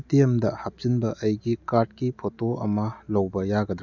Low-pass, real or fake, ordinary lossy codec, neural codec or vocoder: 7.2 kHz; real; none; none